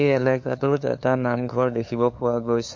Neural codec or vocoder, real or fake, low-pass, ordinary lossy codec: codec, 16 kHz, 2 kbps, FunCodec, trained on LibriTTS, 25 frames a second; fake; 7.2 kHz; MP3, 48 kbps